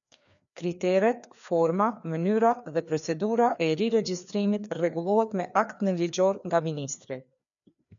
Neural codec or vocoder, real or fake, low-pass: codec, 16 kHz, 2 kbps, FreqCodec, larger model; fake; 7.2 kHz